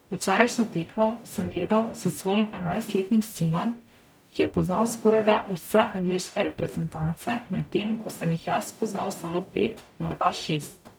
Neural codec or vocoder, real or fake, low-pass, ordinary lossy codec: codec, 44.1 kHz, 0.9 kbps, DAC; fake; none; none